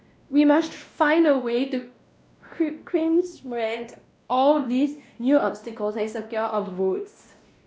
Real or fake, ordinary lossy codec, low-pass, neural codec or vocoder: fake; none; none; codec, 16 kHz, 1 kbps, X-Codec, WavLM features, trained on Multilingual LibriSpeech